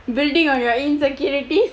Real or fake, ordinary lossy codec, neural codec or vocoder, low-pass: real; none; none; none